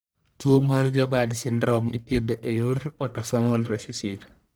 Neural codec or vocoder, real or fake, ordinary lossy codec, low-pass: codec, 44.1 kHz, 1.7 kbps, Pupu-Codec; fake; none; none